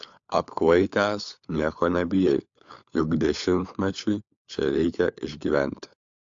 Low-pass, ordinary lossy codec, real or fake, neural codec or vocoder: 7.2 kHz; AAC, 64 kbps; fake; codec, 16 kHz, 4 kbps, FunCodec, trained on LibriTTS, 50 frames a second